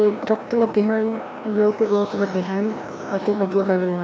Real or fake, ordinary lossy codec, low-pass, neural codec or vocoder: fake; none; none; codec, 16 kHz, 1 kbps, FreqCodec, larger model